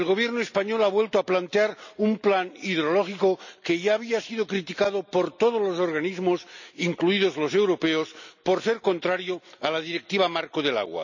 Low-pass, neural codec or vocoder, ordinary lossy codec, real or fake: 7.2 kHz; none; none; real